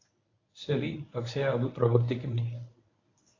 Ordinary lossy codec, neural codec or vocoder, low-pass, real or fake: AAC, 32 kbps; codec, 24 kHz, 0.9 kbps, WavTokenizer, medium speech release version 1; 7.2 kHz; fake